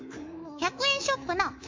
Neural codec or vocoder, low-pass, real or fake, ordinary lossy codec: codec, 24 kHz, 3.1 kbps, DualCodec; 7.2 kHz; fake; MP3, 48 kbps